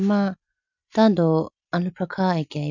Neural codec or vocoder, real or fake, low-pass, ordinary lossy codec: none; real; 7.2 kHz; none